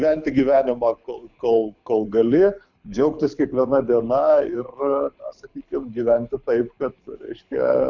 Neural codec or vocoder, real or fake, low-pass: codec, 24 kHz, 6 kbps, HILCodec; fake; 7.2 kHz